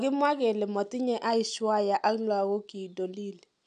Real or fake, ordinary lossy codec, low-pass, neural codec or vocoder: real; none; 9.9 kHz; none